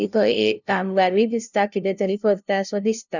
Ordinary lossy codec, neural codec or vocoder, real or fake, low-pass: none; codec, 16 kHz, 0.5 kbps, FunCodec, trained on LibriTTS, 25 frames a second; fake; 7.2 kHz